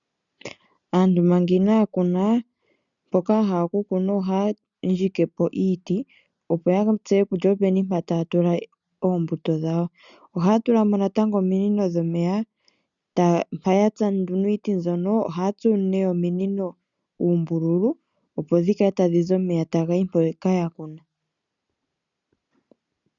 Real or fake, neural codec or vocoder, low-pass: real; none; 7.2 kHz